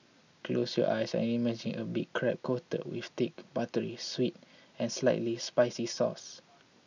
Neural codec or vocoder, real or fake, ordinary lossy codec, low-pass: none; real; none; 7.2 kHz